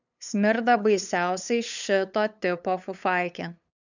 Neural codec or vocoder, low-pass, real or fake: codec, 16 kHz, 8 kbps, FunCodec, trained on LibriTTS, 25 frames a second; 7.2 kHz; fake